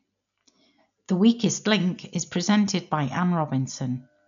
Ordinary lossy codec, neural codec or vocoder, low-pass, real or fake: none; none; 7.2 kHz; real